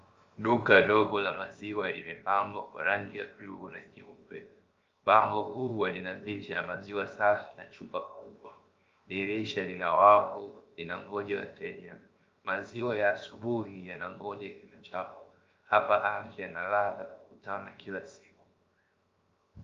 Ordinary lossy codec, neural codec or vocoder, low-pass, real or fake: Opus, 32 kbps; codec, 16 kHz, 0.7 kbps, FocalCodec; 7.2 kHz; fake